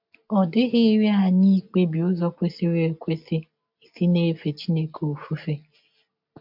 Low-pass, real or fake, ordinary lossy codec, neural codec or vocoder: 5.4 kHz; real; MP3, 48 kbps; none